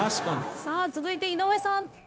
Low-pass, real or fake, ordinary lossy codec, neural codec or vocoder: none; fake; none; codec, 16 kHz, 0.9 kbps, LongCat-Audio-Codec